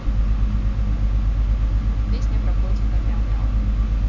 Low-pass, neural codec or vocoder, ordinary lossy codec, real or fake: 7.2 kHz; none; none; real